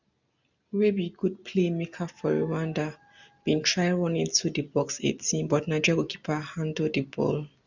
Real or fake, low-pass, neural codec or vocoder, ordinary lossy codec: real; 7.2 kHz; none; none